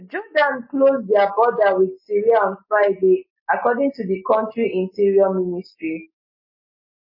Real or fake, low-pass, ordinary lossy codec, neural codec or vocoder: real; 5.4 kHz; MP3, 24 kbps; none